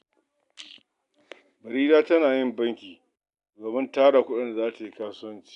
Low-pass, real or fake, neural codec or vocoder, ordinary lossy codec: 10.8 kHz; real; none; none